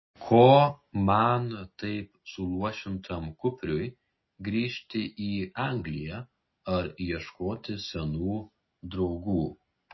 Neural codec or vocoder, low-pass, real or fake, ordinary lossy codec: none; 7.2 kHz; real; MP3, 24 kbps